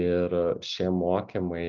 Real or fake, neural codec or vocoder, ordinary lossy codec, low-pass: real; none; Opus, 24 kbps; 7.2 kHz